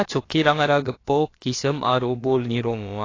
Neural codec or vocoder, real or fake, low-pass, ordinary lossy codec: codec, 16 kHz, about 1 kbps, DyCAST, with the encoder's durations; fake; 7.2 kHz; AAC, 32 kbps